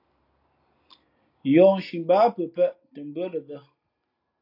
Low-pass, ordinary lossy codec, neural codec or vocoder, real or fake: 5.4 kHz; AAC, 32 kbps; none; real